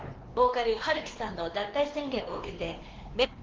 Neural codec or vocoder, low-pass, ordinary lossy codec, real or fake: codec, 16 kHz, 2 kbps, X-Codec, WavLM features, trained on Multilingual LibriSpeech; 7.2 kHz; Opus, 16 kbps; fake